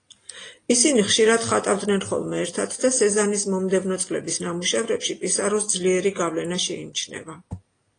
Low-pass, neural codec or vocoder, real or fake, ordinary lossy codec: 9.9 kHz; none; real; AAC, 32 kbps